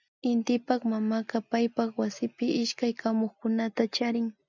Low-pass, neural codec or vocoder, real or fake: 7.2 kHz; none; real